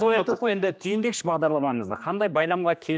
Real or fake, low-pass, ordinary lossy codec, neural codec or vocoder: fake; none; none; codec, 16 kHz, 2 kbps, X-Codec, HuBERT features, trained on general audio